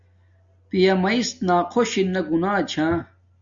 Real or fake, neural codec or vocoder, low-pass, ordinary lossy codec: real; none; 7.2 kHz; Opus, 64 kbps